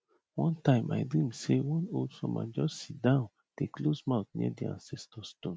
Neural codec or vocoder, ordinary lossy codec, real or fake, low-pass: none; none; real; none